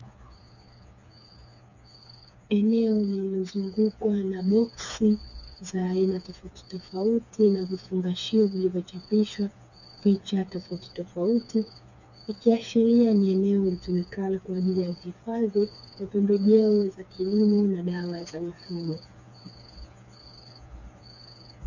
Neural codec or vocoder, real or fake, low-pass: codec, 16 kHz, 4 kbps, FreqCodec, smaller model; fake; 7.2 kHz